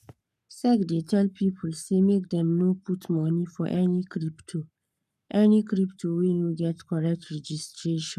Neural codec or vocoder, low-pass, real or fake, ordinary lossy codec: codec, 44.1 kHz, 7.8 kbps, Pupu-Codec; 14.4 kHz; fake; none